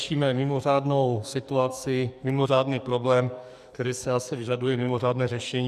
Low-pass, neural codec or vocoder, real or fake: 14.4 kHz; codec, 44.1 kHz, 2.6 kbps, SNAC; fake